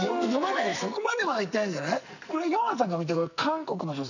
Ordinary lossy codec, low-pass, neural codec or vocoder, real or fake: none; 7.2 kHz; codec, 44.1 kHz, 2.6 kbps, SNAC; fake